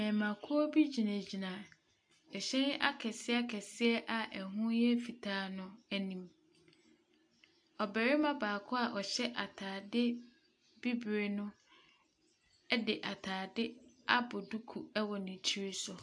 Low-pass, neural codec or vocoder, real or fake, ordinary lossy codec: 9.9 kHz; none; real; AAC, 64 kbps